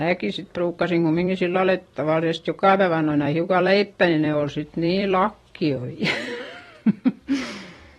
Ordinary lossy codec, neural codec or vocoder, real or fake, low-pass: AAC, 32 kbps; vocoder, 44.1 kHz, 128 mel bands every 256 samples, BigVGAN v2; fake; 19.8 kHz